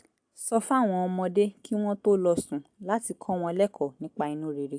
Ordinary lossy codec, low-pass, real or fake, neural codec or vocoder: AAC, 64 kbps; 9.9 kHz; real; none